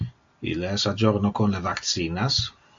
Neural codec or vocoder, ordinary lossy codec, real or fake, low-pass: none; AAC, 64 kbps; real; 7.2 kHz